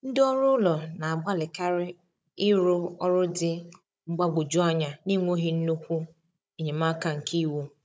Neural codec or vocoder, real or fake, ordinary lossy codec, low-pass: codec, 16 kHz, 16 kbps, FunCodec, trained on Chinese and English, 50 frames a second; fake; none; none